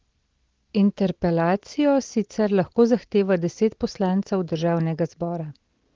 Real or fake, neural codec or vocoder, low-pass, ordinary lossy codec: real; none; 7.2 kHz; Opus, 16 kbps